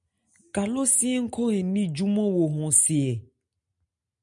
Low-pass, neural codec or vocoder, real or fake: 10.8 kHz; none; real